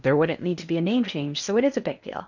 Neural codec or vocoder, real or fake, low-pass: codec, 16 kHz in and 24 kHz out, 0.8 kbps, FocalCodec, streaming, 65536 codes; fake; 7.2 kHz